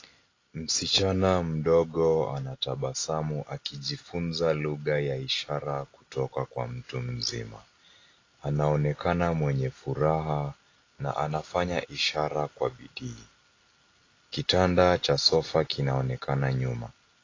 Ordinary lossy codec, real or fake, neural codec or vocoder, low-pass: AAC, 32 kbps; real; none; 7.2 kHz